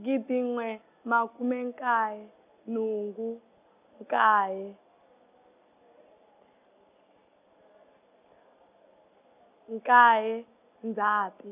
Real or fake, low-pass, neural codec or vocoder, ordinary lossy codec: real; 3.6 kHz; none; none